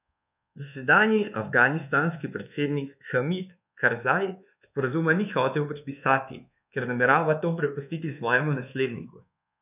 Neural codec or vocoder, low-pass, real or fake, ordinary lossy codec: codec, 24 kHz, 1.2 kbps, DualCodec; 3.6 kHz; fake; none